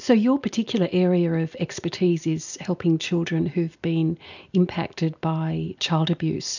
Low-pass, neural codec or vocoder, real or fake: 7.2 kHz; none; real